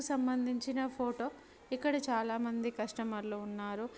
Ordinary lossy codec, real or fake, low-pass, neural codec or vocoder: none; real; none; none